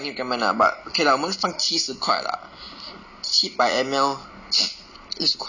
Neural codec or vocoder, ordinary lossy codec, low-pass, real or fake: none; none; 7.2 kHz; real